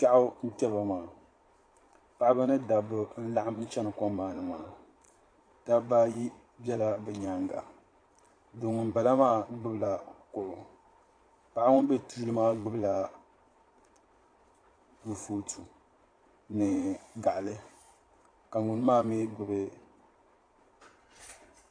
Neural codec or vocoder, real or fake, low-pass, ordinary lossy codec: vocoder, 22.05 kHz, 80 mel bands, Vocos; fake; 9.9 kHz; AAC, 48 kbps